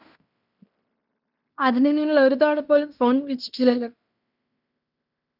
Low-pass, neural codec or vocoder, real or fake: 5.4 kHz; codec, 16 kHz in and 24 kHz out, 0.9 kbps, LongCat-Audio-Codec, fine tuned four codebook decoder; fake